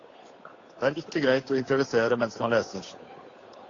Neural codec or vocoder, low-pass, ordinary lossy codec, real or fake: codec, 16 kHz, 8 kbps, FunCodec, trained on Chinese and English, 25 frames a second; 7.2 kHz; AAC, 32 kbps; fake